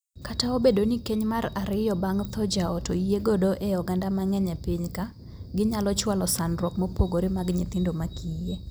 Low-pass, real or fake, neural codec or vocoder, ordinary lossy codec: none; real; none; none